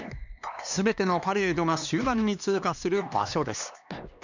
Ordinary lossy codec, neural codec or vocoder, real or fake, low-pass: none; codec, 16 kHz, 2 kbps, X-Codec, HuBERT features, trained on LibriSpeech; fake; 7.2 kHz